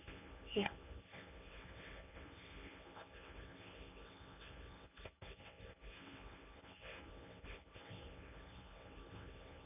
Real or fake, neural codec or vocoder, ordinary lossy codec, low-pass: fake; codec, 24 kHz, 0.9 kbps, WavTokenizer, medium speech release version 1; none; 3.6 kHz